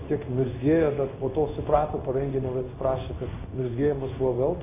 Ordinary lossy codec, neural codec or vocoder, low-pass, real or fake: AAC, 24 kbps; codec, 16 kHz in and 24 kHz out, 1 kbps, XY-Tokenizer; 3.6 kHz; fake